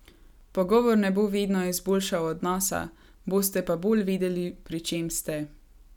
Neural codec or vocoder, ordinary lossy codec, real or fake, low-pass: none; none; real; 19.8 kHz